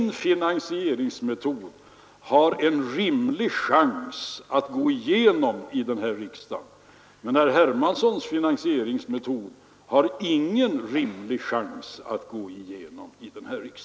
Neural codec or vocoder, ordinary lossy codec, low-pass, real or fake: none; none; none; real